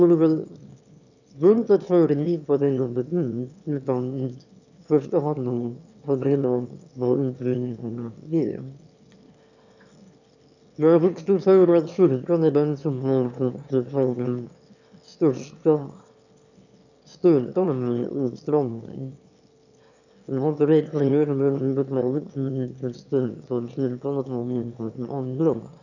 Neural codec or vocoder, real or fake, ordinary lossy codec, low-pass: autoencoder, 22.05 kHz, a latent of 192 numbers a frame, VITS, trained on one speaker; fake; none; 7.2 kHz